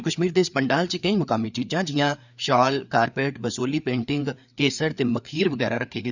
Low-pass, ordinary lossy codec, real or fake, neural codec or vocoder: 7.2 kHz; none; fake; codec, 16 kHz, 4 kbps, FreqCodec, larger model